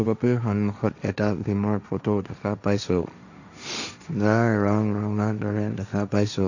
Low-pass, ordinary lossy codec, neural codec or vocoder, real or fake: 7.2 kHz; none; codec, 16 kHz, 1.1 kbps, Voila-Tokenizer; fake